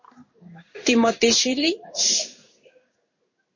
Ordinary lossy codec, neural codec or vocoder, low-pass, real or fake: MP3, 32 kbps; codec, 16 kHz in and 24 kHz out, 1 kbps, XY-Tokenizer; 7.2 kHz; fake